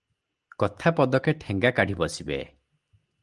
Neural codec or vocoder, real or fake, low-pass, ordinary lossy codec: none; real; 10.8 kHz; Opus, 24 kbps